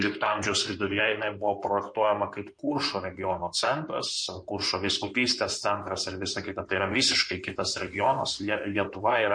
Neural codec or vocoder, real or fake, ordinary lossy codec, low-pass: codec, 16 kHz in and 24 kHz out, 2.2 kbps, FireRedTTS-2 codec; fake; MP3, 48 kbps; 9.9 kHz